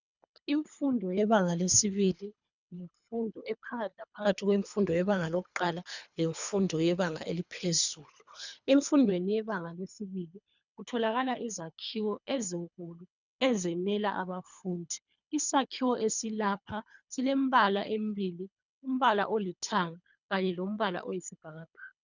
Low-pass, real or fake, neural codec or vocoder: 7.2 kHz; fake; codec, 24 kHz, 3 kbps, HILCodec